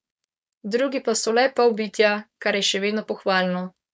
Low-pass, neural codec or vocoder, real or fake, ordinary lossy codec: none; codec, 16 kHz, 4.8 kbps, FACodec; fake; none